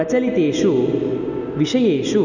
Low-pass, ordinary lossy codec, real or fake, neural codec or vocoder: 7.2 kHz; none; real; none